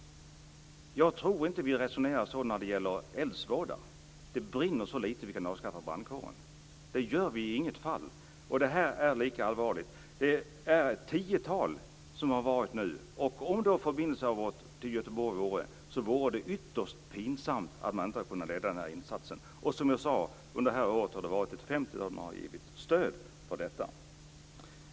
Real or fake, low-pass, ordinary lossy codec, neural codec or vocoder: real; none; none; none